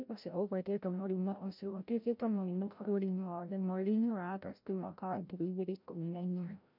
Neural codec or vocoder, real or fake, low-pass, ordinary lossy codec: codec, 16 kHz, 0.5 kbps, FreqCodec, larger model; fake; 5.4 kHz; MP3, 48 kbps